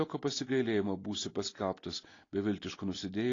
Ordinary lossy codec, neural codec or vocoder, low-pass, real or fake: AAC, 32 kbps; none; 7.2 kHz; real